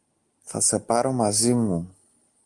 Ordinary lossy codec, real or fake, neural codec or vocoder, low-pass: Opus, 24 kbps; real; none; 10.8 kHz